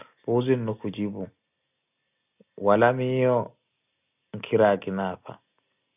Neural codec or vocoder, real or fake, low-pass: none; real; 3.6 kHz